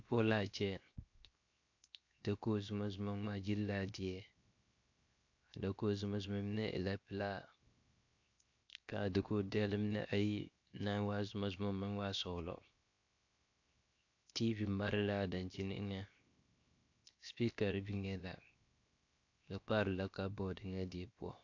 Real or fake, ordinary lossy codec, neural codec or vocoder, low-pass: fake; AAC, 48 kbps; codec, 16 kHz, 0.7 kbps, FocalCodec; 7.2 kHz